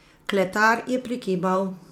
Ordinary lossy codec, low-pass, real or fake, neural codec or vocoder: MP3, 96 kbps; 19.8 kHz; fake; vocoder, 44.1 kHz, 128 mel bands every 512 samples, BigVGAN v2